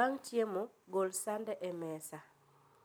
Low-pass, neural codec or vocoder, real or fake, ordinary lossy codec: none; none; real; none